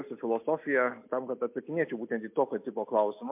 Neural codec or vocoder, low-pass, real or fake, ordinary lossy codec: none; 3.6 kHz; real; MP3, 32 kbps